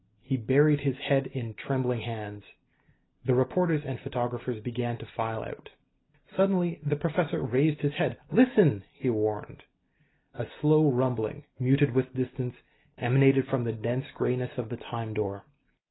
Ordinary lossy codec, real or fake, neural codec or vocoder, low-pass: AAC, 16 kbps; real; none; 7.2 kHz